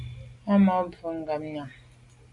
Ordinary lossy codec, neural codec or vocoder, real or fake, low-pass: MP3, 64 kbps; none; real; 10.8 kHz